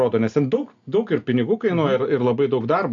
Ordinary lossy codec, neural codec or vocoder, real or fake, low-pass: AAC, 64 kbps; none; real; 7.2 kHz